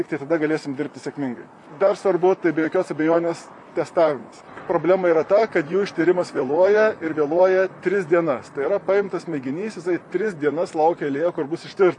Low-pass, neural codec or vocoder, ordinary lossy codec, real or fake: 10.8 kHz; vocoder, 44.1 kHz, 128 mel bands, Pupu-Vocoder; MP3, 64 kbps; fake